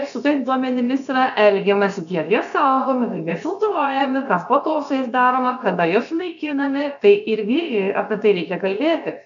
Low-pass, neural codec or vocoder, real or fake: 7.2 kHz; codec, 16 kHz, about 1 kbps, DyCAST, with the encoder's durations; fake